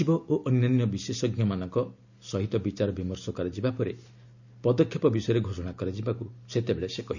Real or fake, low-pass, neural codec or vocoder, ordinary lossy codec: real; 7.2 kHz; none; none